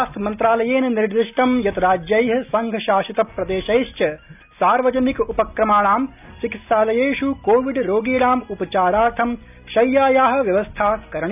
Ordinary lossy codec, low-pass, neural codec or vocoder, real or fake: none; 3.6 kHz; none; real